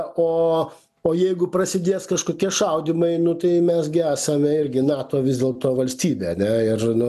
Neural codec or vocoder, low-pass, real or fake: none; 14.4 kHz; real